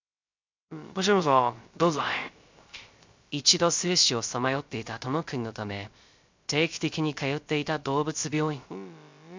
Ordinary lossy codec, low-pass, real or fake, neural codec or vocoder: MP3, 64 kbps; 7.2 kHz; fake; codec, 16 kHz, 0.3 kbps, FocalCodec